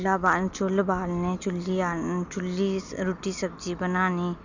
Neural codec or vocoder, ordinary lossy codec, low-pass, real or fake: none; none; 7.2 kHz; real